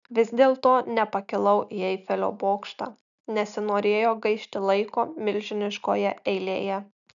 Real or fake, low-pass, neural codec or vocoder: real; 7.2 kHz; none